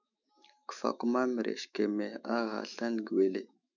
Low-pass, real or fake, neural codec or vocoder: 7.2 kHz; fake; autoencoder, 48 kHz, 128 numbers a frame, DAC-VAE, trained on Japanese speech